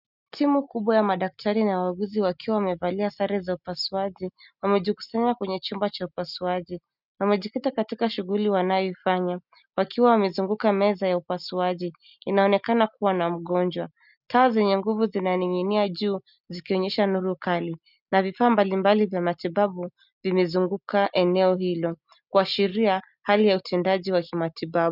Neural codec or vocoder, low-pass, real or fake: none; 5.4 kHz; real